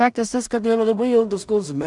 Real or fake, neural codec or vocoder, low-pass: fake; codec, 16 kHz in and 24 kHz out, 0.4 kbps, LongCat-Audio-Codec, two codebook decoder; 10.8 kHz